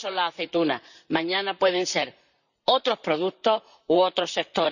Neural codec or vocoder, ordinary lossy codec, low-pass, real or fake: vocoder, 44.1 kHz, 128 mel bands, Pupu-Vocoder; none; 7.2 kHz; fake